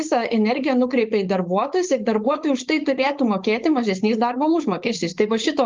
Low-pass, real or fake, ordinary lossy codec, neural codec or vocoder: 7.2 kHz; fake; Opus, 32 kbps; codec, 16 kHz, 4.8 kbps, FACodec